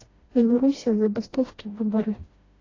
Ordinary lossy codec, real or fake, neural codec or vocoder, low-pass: AAC, 32 kbps; fake; codec, 16 kHz, 1 kbps, FreqCodec, smaller model; 7.2 kHz